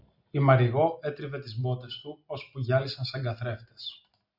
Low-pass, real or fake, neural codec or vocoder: 5.4 kHz; real; none